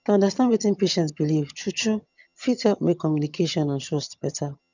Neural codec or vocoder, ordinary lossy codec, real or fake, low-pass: vocoder, 22.05 kHz, 80 mel bands, HiFi-GAN; none; fake; 7.2 kHz